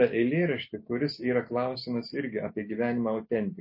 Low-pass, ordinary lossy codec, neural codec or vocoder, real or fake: 5.4 kHz; MP3, 24 kbps; none; real